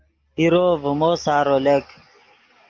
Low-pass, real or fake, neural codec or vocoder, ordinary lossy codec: 7.2 kHz; real; none; Opus, 32 kbps